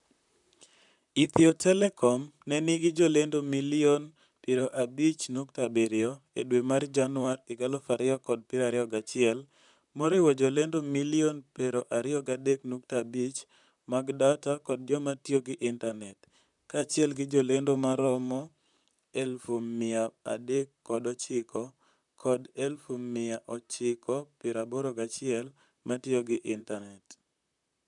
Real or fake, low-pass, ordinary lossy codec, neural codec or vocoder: fake; 10.8 kHz; none; vocoder, 44.1 kHz, 128 mel bands, Pupu-Vocoder